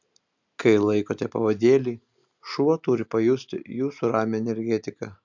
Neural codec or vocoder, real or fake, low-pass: none; real; 7.2 kHz